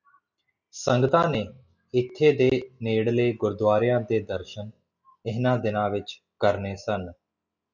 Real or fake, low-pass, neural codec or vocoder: real; 7.2 kHz; none